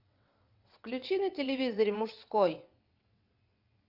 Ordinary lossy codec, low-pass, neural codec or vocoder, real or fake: AAC, 48 kbps; 5.4 kHz; vocoder, 22.05 kHz, 80 mel bands, WaveNeXt; fake